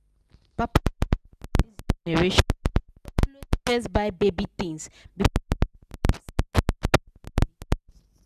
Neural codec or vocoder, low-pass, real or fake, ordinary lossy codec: none; 14.4 kHz; real; none